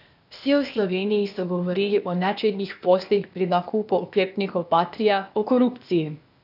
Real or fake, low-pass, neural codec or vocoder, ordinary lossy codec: fake; 5.4 kHz; codec, 16 kHz, 0.8 kbps, ZipCodec; none